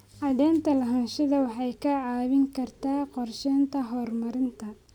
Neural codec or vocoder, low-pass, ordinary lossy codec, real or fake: none; 19.8 kHz; none; real